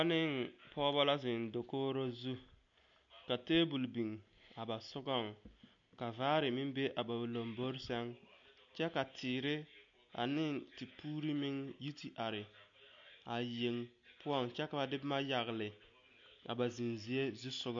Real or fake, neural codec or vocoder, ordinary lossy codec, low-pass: real; none; MP3, 48 kbps; 7.2 kHz